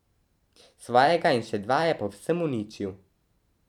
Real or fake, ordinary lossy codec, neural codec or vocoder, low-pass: fake; none; vocoder, 44.1 kHz, 128 mel bands every 512 samples, BigVGAN v2; 19.8 kHz